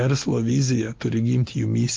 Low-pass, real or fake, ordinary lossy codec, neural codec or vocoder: 7.2 kHz; real; Opus, 32 kbps; none